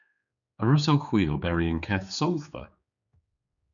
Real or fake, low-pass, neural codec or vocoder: fake; 7.2 kHz; codec, 16 kHz, 4 kbps, X-Codec, HuBERT features, trained on general audio